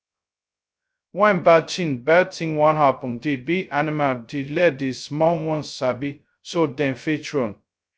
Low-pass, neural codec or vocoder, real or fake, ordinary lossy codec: none; codec, 16 kHz, 0.2 kbps, FocalCodec; fake; none